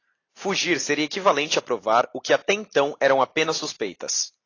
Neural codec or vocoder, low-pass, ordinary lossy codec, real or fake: none; 7.2 kHz; AAC, 32 kbps; real